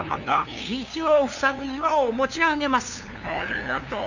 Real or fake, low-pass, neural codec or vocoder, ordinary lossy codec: fake; 7.2 kHz; codec, 16 kHz, 2 kbps, FunCodec, trained on LibriTTS, 25 frames a second; none